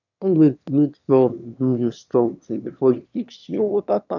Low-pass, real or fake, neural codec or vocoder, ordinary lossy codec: 7.2 kHz; fake; autoencoder, 22.05 kHz, a latent of 192 numbers a frame, VITS, trained on one speaker; none